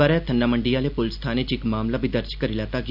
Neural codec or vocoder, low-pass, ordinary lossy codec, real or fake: none; 5.4 kHz; none; real